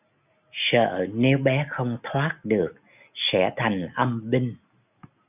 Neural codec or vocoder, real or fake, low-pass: none; real; 3.6 kHz